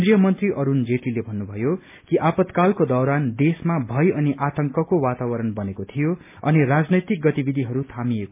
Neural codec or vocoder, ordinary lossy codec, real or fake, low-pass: none; MP3, 32 kbps; real; 3.6 kHz